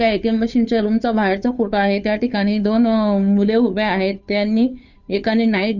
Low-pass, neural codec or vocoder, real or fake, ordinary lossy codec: 7.2 kHz; codec, 16 kHz, 2 kbps, FunCodec, trained on Chinese and English, 25 frames a second; fake; none